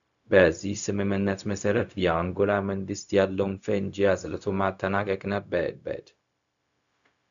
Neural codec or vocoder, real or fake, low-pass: codec, 16 kHz, 0.4 kbps, LongCat-Audio-Codec; fake; 7.2 kHz